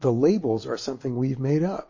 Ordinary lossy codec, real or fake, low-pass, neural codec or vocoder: MP3, 32 kbps; real; 7.2 kHz; none